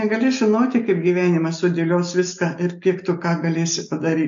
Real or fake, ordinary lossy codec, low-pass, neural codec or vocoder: real; AAC, 64 kbps; 7.2 kHz; none